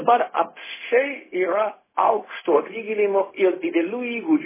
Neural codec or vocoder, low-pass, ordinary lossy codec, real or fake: codec, 16 kHz, 0.4 kbps, LongCat-Audio-Codec; 3.6 kHz; MP3, 16 kbps; fake